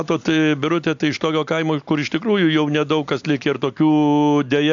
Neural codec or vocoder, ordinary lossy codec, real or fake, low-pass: none; AAC, 64 kbps; real; 7.2 kHz